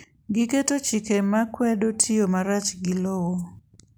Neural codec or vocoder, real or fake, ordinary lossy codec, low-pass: none; real; none; none